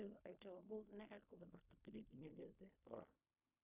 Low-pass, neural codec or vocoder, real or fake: 3.6 kHz; codec, 16 kHz in and 24 kHz out, 0.4 kbps, LongCat-Audio-Codec, fine tuned four codebook decoder; fake